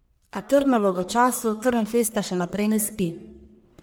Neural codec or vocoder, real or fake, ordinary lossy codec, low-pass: codec, 44.1 kHz, 1.7 kbps, Pupu-Codec; fake; none; none